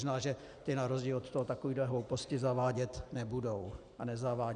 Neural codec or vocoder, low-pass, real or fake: none; 9.9 kHz; real